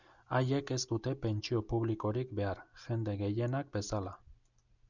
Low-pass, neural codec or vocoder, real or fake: 7.2 kHz; none; real